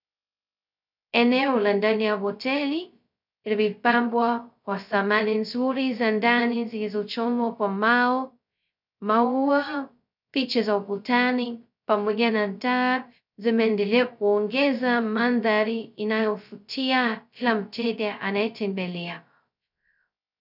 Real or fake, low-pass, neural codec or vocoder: fake; 5.4 kHz; codec, 16 kHz, 0.2 kbps, FocalCodec